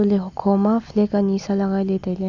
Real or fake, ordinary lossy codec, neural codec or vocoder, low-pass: real; none; none; 7.2 kHz